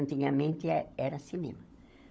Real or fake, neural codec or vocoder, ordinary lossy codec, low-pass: fake; codec, 16 kHz, 16 kbps, FunCodec, trained on LibriTTS, 50 frames a second; none; none